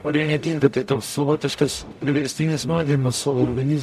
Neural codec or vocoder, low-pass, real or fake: codec, 44.1 kHz, 0.9 kbps, DAC; 14.4 kHz; fake